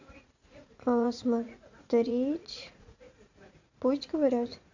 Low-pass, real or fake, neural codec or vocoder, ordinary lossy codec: 7.2 kHz; fake; vocoder, 44.1 kHz, 80 mel bands, Vocos; AAC, 48 kbps